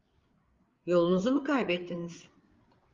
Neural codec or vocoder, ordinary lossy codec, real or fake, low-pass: codec, 16 kHz, 8 kbps, FreqCodec, larger model; Opus, 32 kbps; fake; 7.2 kHz